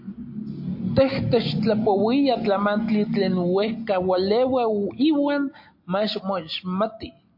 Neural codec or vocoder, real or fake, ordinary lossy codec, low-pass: none; real; MP3, 32 kbps; 5.4 kHz